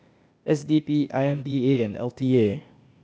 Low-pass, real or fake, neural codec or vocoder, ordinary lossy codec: none; fake; codec, 16 kHz, 0.8 kbps, ZipCodec; none